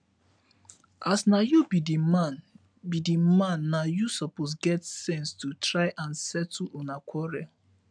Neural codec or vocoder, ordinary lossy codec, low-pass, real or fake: none; none; 9.9 kHz; real